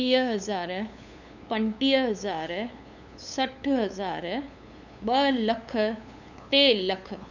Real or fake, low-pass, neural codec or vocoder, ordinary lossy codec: fake; 7.2 kHz; codec, 16 kHz, 8 kbps, FunCodec, trained on LibriTTS, 25 frames a second; none